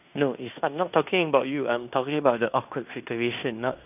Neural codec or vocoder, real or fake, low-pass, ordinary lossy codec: codec, 16 kHz in and 24 kHz out, 0.9 kbps, LongCat-Audio-Codec, fine tuned four codebook decoder; fake; 3.6 kHz; none